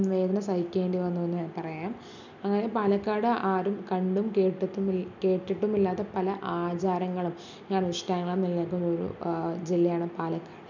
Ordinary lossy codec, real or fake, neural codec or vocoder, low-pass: none; real; none; 7.2 kHz